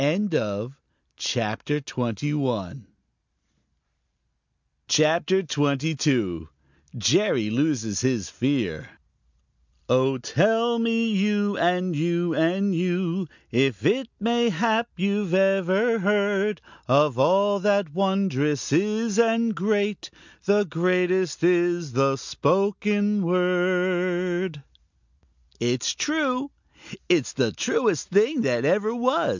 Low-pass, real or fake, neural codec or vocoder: 7.2 kHz; real; none